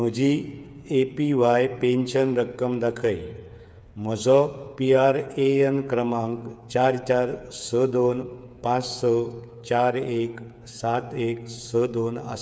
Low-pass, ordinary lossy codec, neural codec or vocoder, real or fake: none; none; codec, 16 kHz, 8 kbps, FreqCodec, smaller model; fake